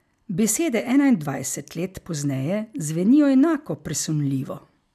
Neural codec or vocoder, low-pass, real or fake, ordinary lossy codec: none; 14.4 kHz; real; none